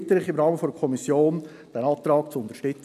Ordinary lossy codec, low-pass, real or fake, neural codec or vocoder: none; 14.4 kHz; real; none